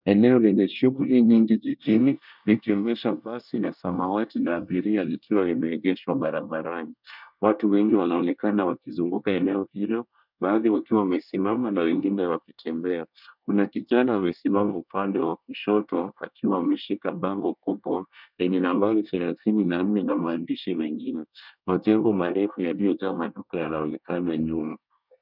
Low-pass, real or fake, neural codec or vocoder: 5.4 kHz; fake; codec, 24 kHz, 1 kbps, SNAC